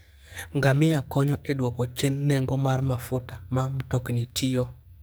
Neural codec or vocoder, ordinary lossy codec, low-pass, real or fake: codec, 44.1 kHz, 2.6 kbps, SNAC; none; none; fake